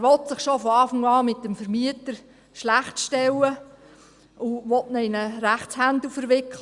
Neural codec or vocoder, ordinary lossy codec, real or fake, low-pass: none; Opus, 64 kbps; real; 10.8 kHz